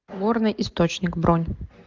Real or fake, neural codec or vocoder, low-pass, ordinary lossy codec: real; none; 7.2 kHz; Opus, 32 kbps